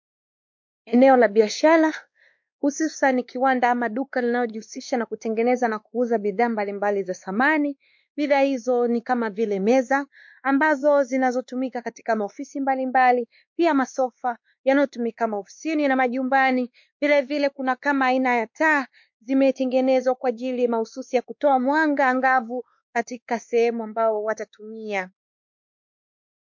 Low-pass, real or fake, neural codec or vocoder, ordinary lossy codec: 7.2 kHz; fake; codec, 16 kHz, 2 kbps, X-Codec, WavLM features, trained on Multilingual LibriSpeech; MP3, 48 kbps